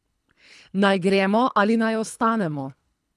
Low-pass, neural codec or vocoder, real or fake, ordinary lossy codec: none; codec, 24 kHz, 3 kbps, HILCodec; fake; none